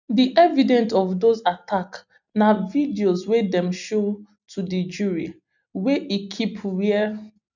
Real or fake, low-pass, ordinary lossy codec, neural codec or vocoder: real; 7.2 kHz; none; none